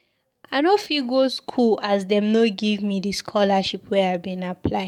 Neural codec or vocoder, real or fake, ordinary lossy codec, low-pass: codec, 44.1 kHz, 7.8 kbps, DAC; fake; MP3, 96 kbps; 19.8 kHz